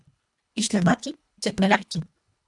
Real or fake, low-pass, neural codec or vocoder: fake; 10.8 kHz; codec, 24 kHz, 1.5 kbps, HILCodec